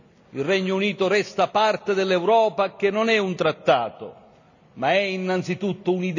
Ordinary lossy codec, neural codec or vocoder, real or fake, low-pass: none; none; real; 7.2 kHz